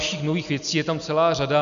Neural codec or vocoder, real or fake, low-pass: none; real; 7.2 kHz